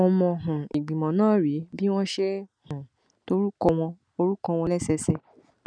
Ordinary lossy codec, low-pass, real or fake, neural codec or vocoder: none; 9.9 kHz; real; none